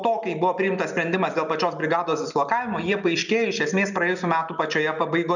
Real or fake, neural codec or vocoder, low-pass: real; none; 7.2 kHz